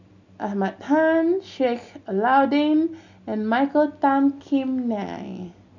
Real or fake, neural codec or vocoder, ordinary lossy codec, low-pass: real; none; none; 7.2 kHz